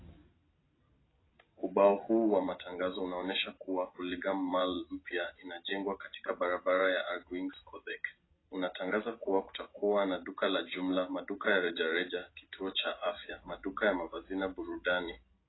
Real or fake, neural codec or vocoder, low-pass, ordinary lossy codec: real; none; 7.2 kHz; AAC, 16 kbps